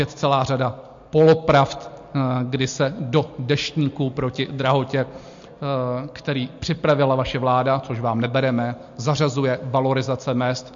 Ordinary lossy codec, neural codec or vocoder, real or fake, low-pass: MP3, 48 kbps; none; real; 7.2 kHz